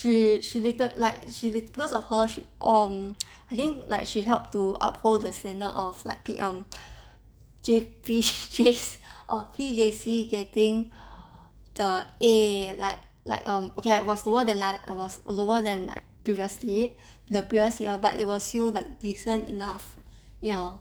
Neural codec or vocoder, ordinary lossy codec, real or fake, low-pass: codec, 44.1 kHz, 2.6 kbps, SNAC; none; fake; none